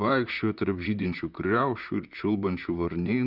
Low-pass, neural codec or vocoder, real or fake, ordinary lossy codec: 5.4 kHz; vocoder, 44.1 kHz, 128 mel bands, Pupu-Vocoder; fake; Opus, 64 kbps